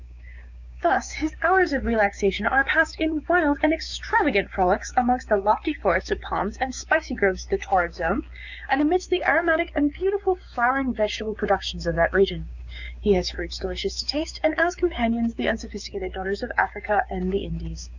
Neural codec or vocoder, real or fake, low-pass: codec, 16 kHz, 6 kbps, DAC; fake; 7.2 kHz